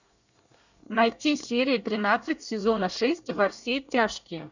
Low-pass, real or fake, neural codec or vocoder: 7.2 kHz; fake; codec, 24 kHz, 1 kbps, SNAC